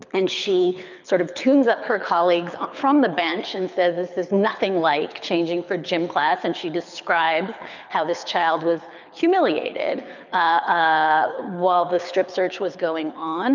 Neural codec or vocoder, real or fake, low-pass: codec, 24 kHz, 6 kbps, HILCodec; fake; 7.2 kHz